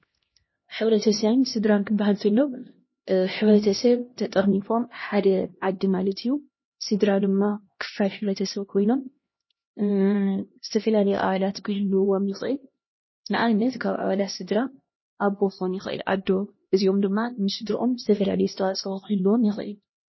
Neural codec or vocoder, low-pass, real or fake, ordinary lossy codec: codec, 16 kHz, 1 kbps, X-Codec, HuBERT features, trained on LibriSpeech; 7.2 kHz; fake; MP3, 24 kbps